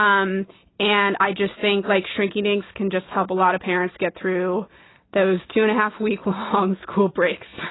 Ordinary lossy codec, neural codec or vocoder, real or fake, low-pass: AAC, 16 kbps; none; real; 7.2 kHz